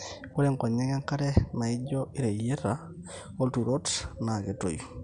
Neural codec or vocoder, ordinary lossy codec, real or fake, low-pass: none; none; real; 9.9 kHz